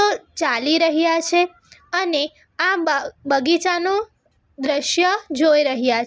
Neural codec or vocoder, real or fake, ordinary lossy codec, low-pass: none; real; none; none